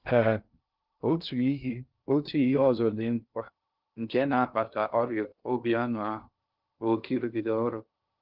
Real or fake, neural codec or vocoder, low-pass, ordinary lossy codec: fake; codec, 16 kHz in and 24 kHz out, 0.6 kbps, FocalCodec, streaming, 2048 codes; 5.4 kHz; Opus, 24 kbps